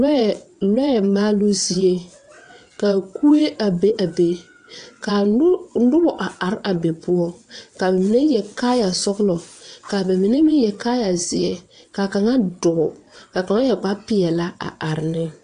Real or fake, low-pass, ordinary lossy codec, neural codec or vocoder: fake; 9.9 kHz; AAC, 64 kbps; vocoder, 22.05 kHz, 80 mel bands, WaveNeXt